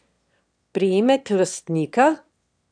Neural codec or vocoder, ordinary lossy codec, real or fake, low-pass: autoencoder, 22.05 kHz, a latent of 192 numbers a frame, VITS, trained on one speaker; none; fake; 9.9 kHz